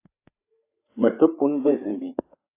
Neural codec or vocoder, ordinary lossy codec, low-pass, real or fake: codec, 16 kHz, 4 kbps, FreqCodec, larger model; AAC, 24 kbps; 3.6 kHz; fake